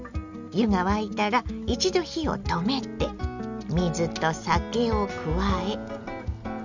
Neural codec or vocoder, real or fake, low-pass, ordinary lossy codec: none; real; 7.2 kHz; none